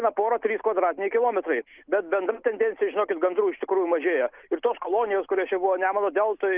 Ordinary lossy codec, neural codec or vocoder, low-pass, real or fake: Opus, 32 kbps; none; 3.6 kHz; real